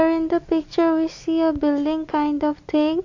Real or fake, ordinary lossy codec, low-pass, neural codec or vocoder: real; AAC, 48 kbps; 7.2 kHz; none